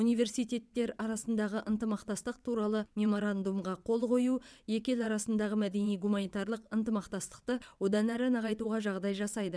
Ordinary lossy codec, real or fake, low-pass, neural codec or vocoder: none; fake; none; vocoder, 22.05 kHz, 80 mel bands, WaveNeXt